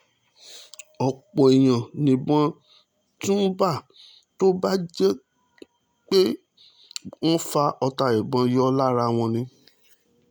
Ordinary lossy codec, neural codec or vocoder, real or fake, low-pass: none; none; real; none